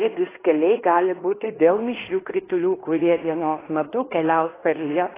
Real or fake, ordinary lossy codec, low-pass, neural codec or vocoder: fake; AAC, 16 kbps; 3.6 kHz; codec, 16 kHz in and 24 kHz out, 0.9 kbps, LongCat-Audio-Codec, fine tuned four codebook decoder